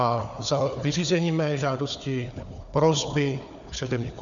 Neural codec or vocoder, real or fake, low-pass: codec, 16 kHz, 4 kbps, FunCodec, trained on Chinese and English, 50 frames a second; fake; 7.2 kHz